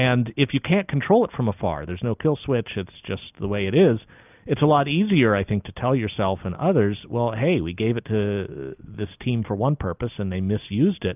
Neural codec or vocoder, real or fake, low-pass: none; real; 3.6 kHz